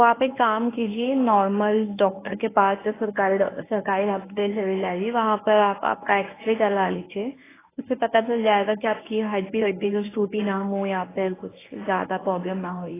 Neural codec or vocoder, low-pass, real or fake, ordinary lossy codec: codec, 24 kHz, 0.9 kbps, WavTokenizer, medium speech release version 1; 3.6 kHz; fake; AAC, 16 kbps